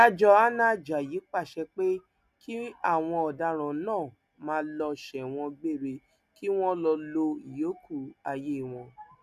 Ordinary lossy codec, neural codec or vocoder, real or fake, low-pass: none; none; real; 14.4 kHz